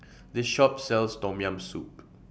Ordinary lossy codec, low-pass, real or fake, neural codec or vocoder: none; none; real; none